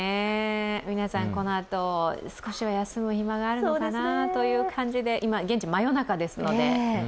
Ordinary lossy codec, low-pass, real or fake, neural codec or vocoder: none; none; real; none